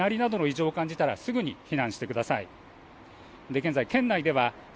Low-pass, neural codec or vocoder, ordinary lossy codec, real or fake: none; none; none; real